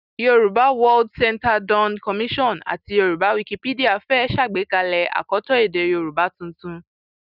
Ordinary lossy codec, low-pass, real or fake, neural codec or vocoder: none; 5.4 kHz; real; none